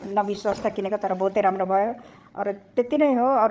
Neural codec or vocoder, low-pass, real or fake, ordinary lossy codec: codec, 16 kHz, 8 kbps, FreqCodec, larger model; none; fake; none